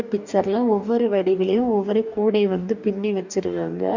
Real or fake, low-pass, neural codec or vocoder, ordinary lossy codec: fake; 7.2 kHz; codec, 44.1 kHz, 2.6 kbps, DAC; none